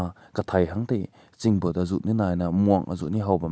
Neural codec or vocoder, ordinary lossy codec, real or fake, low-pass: none; none; real; none